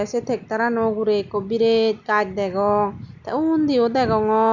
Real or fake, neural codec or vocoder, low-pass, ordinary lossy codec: real; none; 7.2 kHz; none